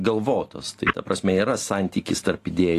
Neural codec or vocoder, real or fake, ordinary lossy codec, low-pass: none; real; AAC, 48 kbps; 14.4 kHz